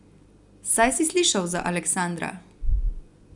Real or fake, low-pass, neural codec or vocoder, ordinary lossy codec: real; 10.8 kHz; none; none